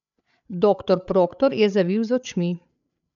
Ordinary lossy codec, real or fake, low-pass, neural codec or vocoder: none; fake; 7.2 kHz; codec, 16 kHz, 8 kbps, FreqCodec, larger model